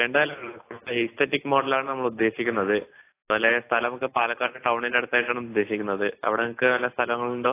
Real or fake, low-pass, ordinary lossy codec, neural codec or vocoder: real; 3.6 kHz; AAC, 24 kbps; none